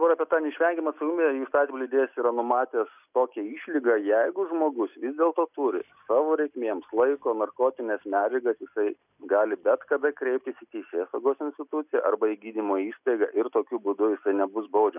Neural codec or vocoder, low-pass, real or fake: none; 3.6 kHz; real